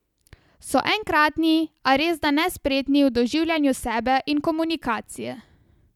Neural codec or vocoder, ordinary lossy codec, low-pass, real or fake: none; none; 19.8 kHz; real